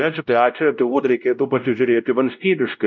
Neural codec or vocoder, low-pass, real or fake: codec, 16 kHz, 0.5 kbps, X-Codec, WavLM features, trained on Multilingual LibriSpeech; 7.2 kHz; fake